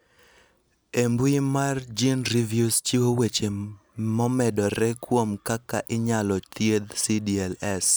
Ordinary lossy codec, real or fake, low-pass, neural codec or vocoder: none; real; none; none